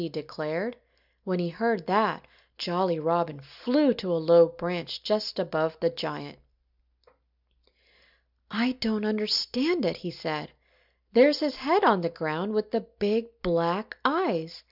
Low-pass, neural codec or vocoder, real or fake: 5.4 kHz; none; real